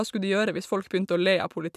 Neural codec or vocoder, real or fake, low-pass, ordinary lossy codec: none; real; 14.4 kHz; none